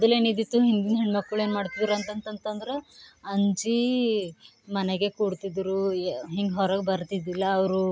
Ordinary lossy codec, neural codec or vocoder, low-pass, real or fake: none; none; none; real